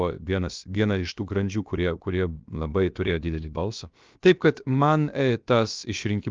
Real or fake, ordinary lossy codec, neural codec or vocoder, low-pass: fake; Opus, 24 kbps; codec, 16 kHz, about 1 kbps, DyCAST, with the encoder's durations; 7.2 kHz